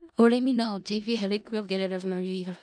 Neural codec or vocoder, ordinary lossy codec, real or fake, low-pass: codec, 16 kHz in and 24 kHz out, 0.4 kbps, LongCat-Audio-Codec, four codebook decoder; none; fake; 9.9 kHz